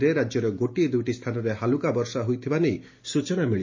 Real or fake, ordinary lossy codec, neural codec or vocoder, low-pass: real; MP3, 32 kbps; none; 7.2 kHz